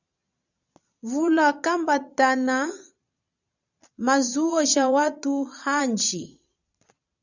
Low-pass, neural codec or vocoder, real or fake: 7.2 kHz; vocoder, 22.05 kHz, 80 mel bands, Vocos; fake